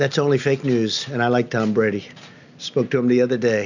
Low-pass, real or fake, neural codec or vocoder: 7.2 kHz; real; none